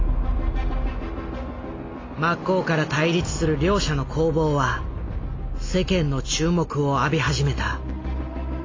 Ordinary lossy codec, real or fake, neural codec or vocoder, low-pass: AAC, 32 kbps; real; none; 7.2 kHz